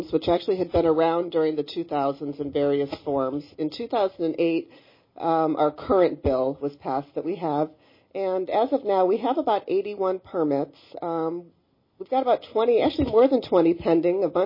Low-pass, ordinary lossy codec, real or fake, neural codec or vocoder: 5.4 kHz; MP3, 24 kbps; real; none